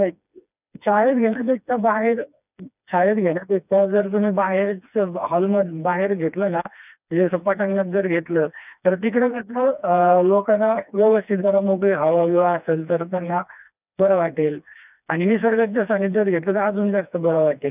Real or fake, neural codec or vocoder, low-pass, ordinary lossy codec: fake; codec, 16 kHz, 2 kbps, FreqCodec, smaller model; 3.6 kHz; none